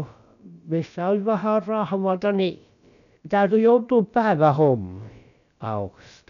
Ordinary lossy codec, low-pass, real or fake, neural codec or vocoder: none; 7.2 kHz; fake; codec, 16 kHz, about 1 kbps, DyCAST, with the encoder's durations